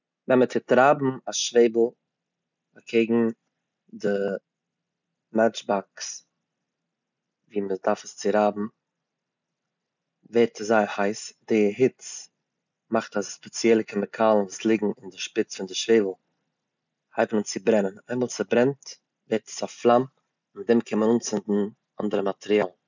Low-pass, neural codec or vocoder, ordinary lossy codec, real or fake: 7.2 kHz; none; none; real